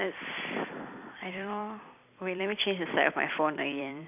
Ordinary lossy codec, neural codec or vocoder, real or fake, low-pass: none; vocoder, 44.1 kHz, 128 mel bands every 256 samples, BigVGAN v2; fake; 3.6 kHz